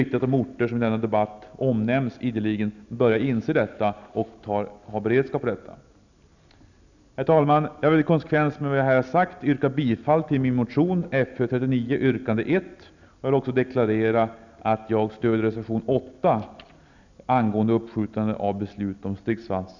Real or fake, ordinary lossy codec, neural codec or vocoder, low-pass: real; none; none; 7.2 kHz